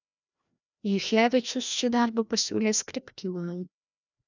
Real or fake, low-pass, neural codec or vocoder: fake; 7.2 kHz; codec, 16 kHz, 1 kbps, FreqCodec, larger model